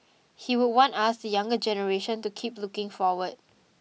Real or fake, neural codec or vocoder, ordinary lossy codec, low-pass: real; none; none; none